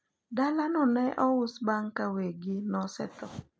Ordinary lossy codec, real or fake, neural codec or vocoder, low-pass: none; real; none; none